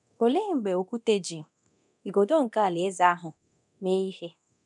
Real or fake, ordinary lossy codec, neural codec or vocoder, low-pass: fake; none; codec, 24 kHz, 0.9 kbps, DualCodec; 10.8 kHz